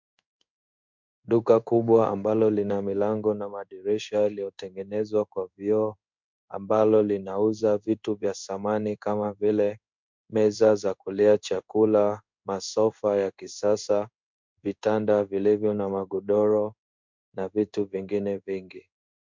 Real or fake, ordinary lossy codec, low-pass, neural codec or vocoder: fake; MP3, 64 kbps; 7.2 kHz; codec, 16 kHz in and 24 kHz out, 1 kbps, XY-Tokenizer